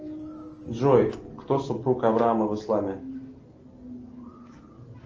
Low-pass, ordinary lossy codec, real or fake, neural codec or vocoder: 7.2 kHz; Opus, 24 kbps; real; none